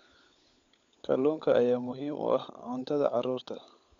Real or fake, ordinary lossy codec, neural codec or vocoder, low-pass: fake; MP3, 64 kbps; codec, 16 kHz, 16 kbps, FunCodec, trained on LibriTTS, 50 frames a second; 7.2 kHz